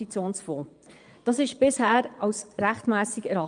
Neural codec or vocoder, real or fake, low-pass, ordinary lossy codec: vocoder, 22.05 kHz, 80 mel bands, Vocos; fake; 9.9 kHz; Opus, 32 kbps